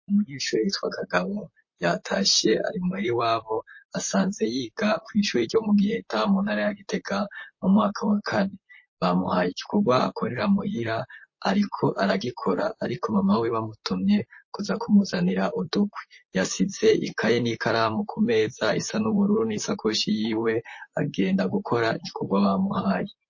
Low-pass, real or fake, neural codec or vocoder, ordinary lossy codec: 7.2 kHz; fake; vocoder, 44.1 kHz, 128 mel bands, Pupu-Vocoder; MP3, 32 kbps